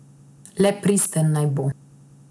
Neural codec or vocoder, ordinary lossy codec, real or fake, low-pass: none; none; real; none